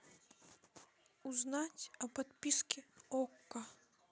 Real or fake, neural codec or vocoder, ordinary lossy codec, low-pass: real; none; none; none